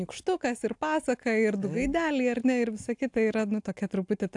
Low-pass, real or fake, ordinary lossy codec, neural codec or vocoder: 10.8 kHz; real; AAC, 64 kbps; none